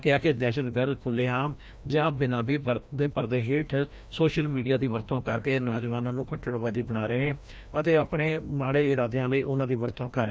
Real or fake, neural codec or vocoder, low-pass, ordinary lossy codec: fake; codec, 16 kHz, 1 kbps, FreqCodec, larger model; none; none